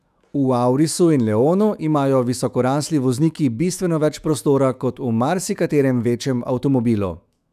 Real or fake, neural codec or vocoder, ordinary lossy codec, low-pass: fake; autoencoder, 48 kHz, 128 numbers a frame, DAC-VAE, trained on Japanese speech; none; 14.4 kHz